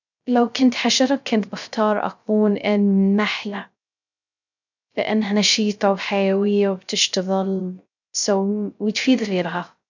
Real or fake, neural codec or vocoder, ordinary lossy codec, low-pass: fake; codec, 16 kHz, 0.3 kbps, FocalCodec; none; 7.2 kHz